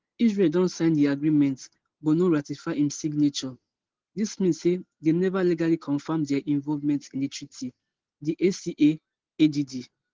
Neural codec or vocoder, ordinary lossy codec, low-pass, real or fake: none; Opus, 16 kbps; 7.2 kHz; real